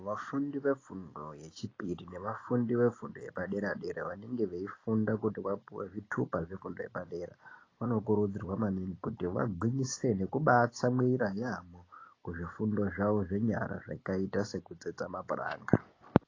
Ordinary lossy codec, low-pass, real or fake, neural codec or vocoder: AAC, 32 kbps; 7.2 kHz; real; none